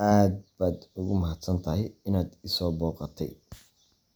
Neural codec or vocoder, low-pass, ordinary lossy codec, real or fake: none; none; none; real